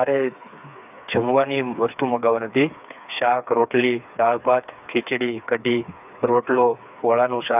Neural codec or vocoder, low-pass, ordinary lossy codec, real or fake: codec, 16 kHz, 4 kbps, FreqCodec, smaller model; 3.6 kHz; none; fake